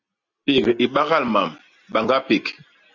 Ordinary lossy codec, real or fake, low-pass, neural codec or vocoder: Opus, 64 kbps; real; 7.2 kHz; none